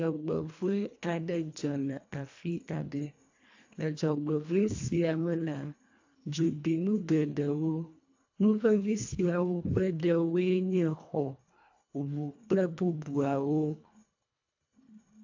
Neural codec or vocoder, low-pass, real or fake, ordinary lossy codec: codec, 24 kHz, 1.5 kbps, HILCodec; 7.2 kHz; fake; AAC, 48 kbps